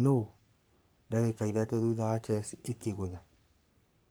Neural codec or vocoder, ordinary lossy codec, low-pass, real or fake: codec, 44.1 kHz, 3.4 kbps, Pupu-Codec; none; none; fake